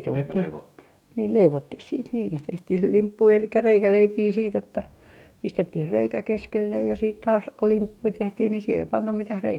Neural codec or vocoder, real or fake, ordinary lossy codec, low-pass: codec, 44.1 kHz, 2.6 kbps, DAC; fake; none; 19.8 kHz